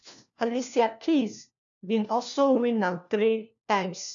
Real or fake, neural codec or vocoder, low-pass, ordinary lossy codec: fake; codec, 16 kHz, 1 kbps, FunCodec, trained on LibriTTS, 50 frames a second; 7.2 kHz; none